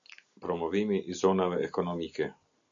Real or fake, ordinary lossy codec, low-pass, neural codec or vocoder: real; AAC, 64 kbps; 7.2 kHz; none